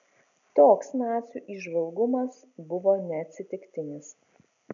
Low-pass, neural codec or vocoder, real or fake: 7.2 kHz; none; real